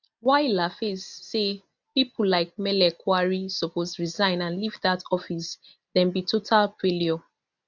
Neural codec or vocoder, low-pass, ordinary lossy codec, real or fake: none; 7.2 kHz; none; real